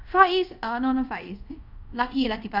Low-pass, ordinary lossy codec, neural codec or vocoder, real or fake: 5.4 kHz; none; codec, 24 kHz, 0.5 kbps, DualCodec; fake